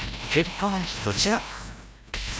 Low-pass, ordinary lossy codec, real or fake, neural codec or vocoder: none; none; fake; codec, 16 kHz, 0.5 kbps, FreqCodec, larger model